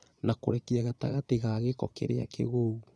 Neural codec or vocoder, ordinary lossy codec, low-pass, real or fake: vocoder, 22.05 kHz, 80 mel bands, Vocos; none; none; fake